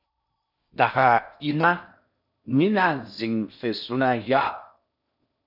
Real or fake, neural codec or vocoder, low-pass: fake; codec, 16 kHz in and 24 kHz out, 0.6 kbps, FocalCodec, streaming, 4096 codes; 5.4 kHz